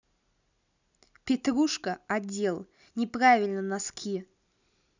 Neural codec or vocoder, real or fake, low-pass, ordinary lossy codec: none; real; 7.2 kHz; none